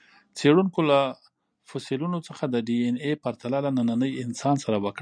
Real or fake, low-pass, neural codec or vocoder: real; 9.9 kHz; none